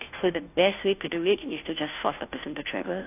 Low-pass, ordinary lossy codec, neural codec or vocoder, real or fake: 3.6 kHz; AAC, 32 kbps; codec, 16 kHz, 0.5 kbps, FunCodec, trained on Chinese and English, 25 frames a second; fake